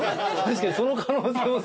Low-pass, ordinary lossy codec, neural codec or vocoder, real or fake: none; none; none; real